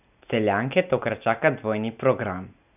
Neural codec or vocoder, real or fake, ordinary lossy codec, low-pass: none; real; none; 3.6 kHz